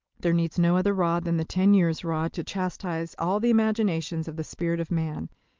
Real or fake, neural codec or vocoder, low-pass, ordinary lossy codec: real; none; 7.2 kHz; Opus, 32 kbps